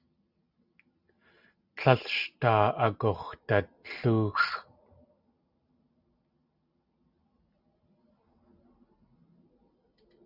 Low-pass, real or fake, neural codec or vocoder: 5.4 kHz; real; none